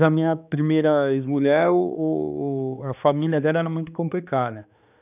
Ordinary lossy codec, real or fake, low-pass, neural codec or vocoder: none; fake; 3.6 kHz; codec, 16 kHz, 2 kbps, X-Codec, HuBERT features, trained on balanced general audio